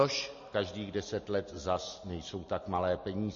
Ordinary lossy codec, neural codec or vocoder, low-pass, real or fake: MP3, 32 kbps; none; 7.2 kHz; real